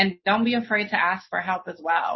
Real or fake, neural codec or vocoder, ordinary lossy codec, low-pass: real; none; MP3, 24 kbps; 7.2 kHz